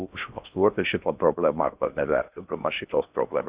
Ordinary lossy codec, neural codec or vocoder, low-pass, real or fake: Opus, 64 kbps; codec, 16 kHz in and 24 kHz out, 0.6 kbps, FocalCodec, streaming, 2048 codes; 3.6 kHz; fake